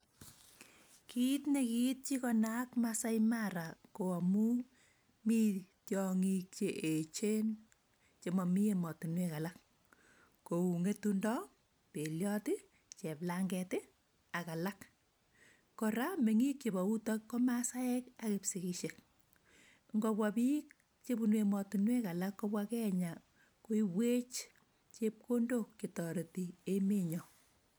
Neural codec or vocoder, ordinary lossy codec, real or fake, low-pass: none; none; real; none